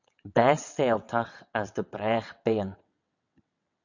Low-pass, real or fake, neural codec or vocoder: 7.2 kHz; fake; vocoder, 22.05 kHz, 80 mel bands, WaveNeXt